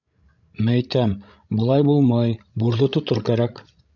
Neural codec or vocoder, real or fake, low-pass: codec, 16 kHz, 16 kbps, FreqCodec, larger model; fake; 7.2 kHz